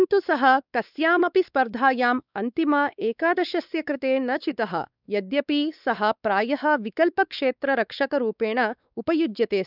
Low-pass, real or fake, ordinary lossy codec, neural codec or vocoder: 5.4 kHz; fake; none; codec, 16 kHz, 4 kbps, X-Codec, WavLM features, trained on Multilingual LibriSpeech